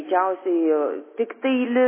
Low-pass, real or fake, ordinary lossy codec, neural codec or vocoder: 3.6 kHz; real; MP3, 16 kbps; none